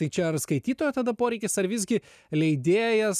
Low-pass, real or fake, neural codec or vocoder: 14.4 kHz; real; none